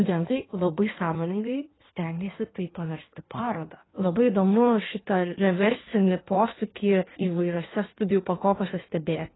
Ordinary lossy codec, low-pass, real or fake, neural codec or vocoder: AAC, 16 kbps; 7.2 kHz; fake; codec, 16 kHz in and 24 kHz out, 1.1 kbps, FireRedTTS-2 codec